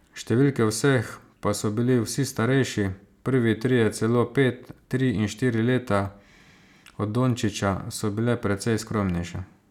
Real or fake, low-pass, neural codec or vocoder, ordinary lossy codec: real; 19.8 kHz; none; none